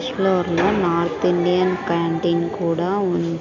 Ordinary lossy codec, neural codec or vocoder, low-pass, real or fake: none; none; 7.2 kHz; real